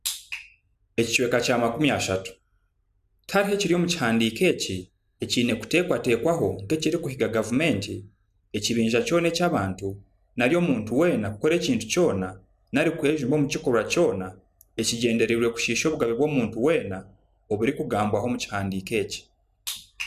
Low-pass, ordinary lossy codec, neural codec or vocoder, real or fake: 14.4 kHz; none; none; real